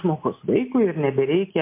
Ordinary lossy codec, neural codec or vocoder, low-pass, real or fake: MP3, 24 kbps; none; 3.6 kHz; real